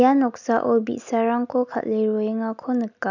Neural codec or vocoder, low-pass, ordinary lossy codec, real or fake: none; 7.2 kHz; none; real